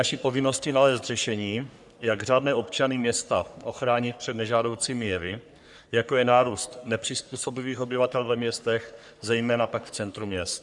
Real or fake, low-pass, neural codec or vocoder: fake; 10.8 kHz; codec, 44.1 kHz, 3.4 kbps, Pupu-Codec